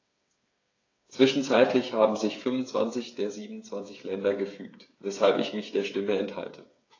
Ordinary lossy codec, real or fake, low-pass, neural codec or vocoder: AAC, 32 kbps; fake; 7.2 kHz; codec, 16 kHz, 8 kbps, FreqCodec, smaller model